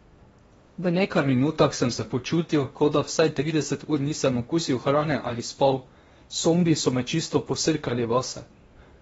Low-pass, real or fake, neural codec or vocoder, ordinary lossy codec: 10.8 kHz; fake; codec, 16 kHz in and 24 kHz out, 0.8 kbps, FocalCodec, streaming, 65536 codes; AAC, 24 kbps